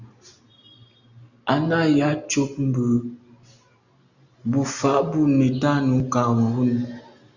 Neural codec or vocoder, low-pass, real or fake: none; 7.2 kHz; real